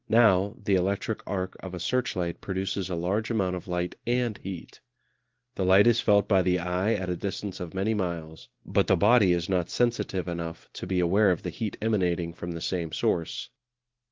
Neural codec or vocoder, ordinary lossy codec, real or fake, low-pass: none; Opus, 16 kbps; real; 7.2 kHz